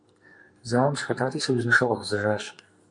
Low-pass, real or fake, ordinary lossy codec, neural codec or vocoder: 10.8 kHz; fake; MP3, 64 kbps; codec, 44.1 kHz, 2.6 kbps, SNAC